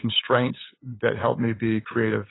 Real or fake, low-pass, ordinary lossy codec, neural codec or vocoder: fake; 7.2 kHz; AAC, 16 kbps; codec, 16 kHz, 8 kbps, FunCodec, trained on LibriTTS, 25 frames a second